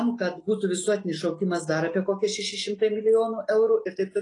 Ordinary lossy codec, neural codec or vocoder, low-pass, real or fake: AAC, 32 kbps; none; 10.8 kHz; real